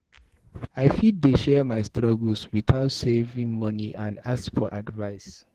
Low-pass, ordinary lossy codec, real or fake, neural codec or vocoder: 14.4 kHz; Opus, 16 kbps; fake; codec, 44.1 kHz, 2.6 kbps, SNAC